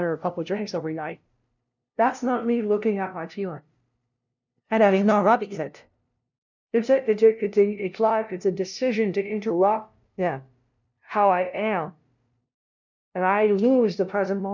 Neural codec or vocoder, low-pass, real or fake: codec, 16 kHz, 0.5 kbps, FunCodec, trained on LibriTTS, 25 frames a second; 7.2 kHz; fake